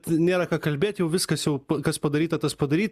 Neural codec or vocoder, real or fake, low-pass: none; real; 14.4 kHz